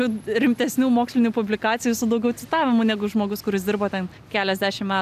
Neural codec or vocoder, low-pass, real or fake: none; 14.4 kHz; real